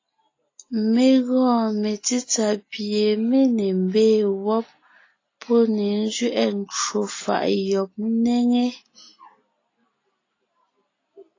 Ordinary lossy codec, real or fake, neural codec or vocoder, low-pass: AAC, 32 kbps; real; none; 7.2 kHz